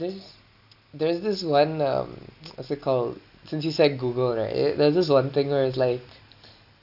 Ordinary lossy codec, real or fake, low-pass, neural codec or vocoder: none; real; 5.4 kHz; none